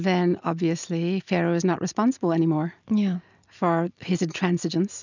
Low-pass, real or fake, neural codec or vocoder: 7.2 kHz; real; none